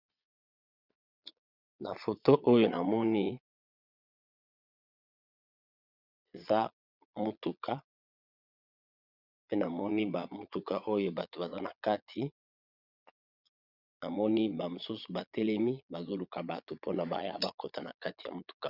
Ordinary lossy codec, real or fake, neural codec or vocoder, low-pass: Opus, 64 kbps; fake; vocoder, 22.05 kHz, 80 mel bands, Vocos; 5.4 kHz